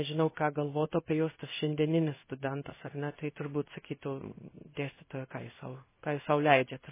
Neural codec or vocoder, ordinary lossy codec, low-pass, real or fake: codec, 16 kHz in and 24 kHz out, 1 kbps, XY-Tokenizer; MP3, 16 kbps; 3.6 kHz; fake